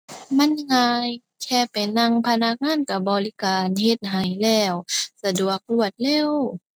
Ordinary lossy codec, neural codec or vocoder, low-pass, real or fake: none; none; none; real